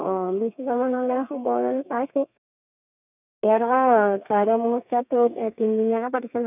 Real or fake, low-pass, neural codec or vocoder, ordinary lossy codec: fake; 3.6 kHz; codec, 32 kHz, 1.9 kbps, SNAC; none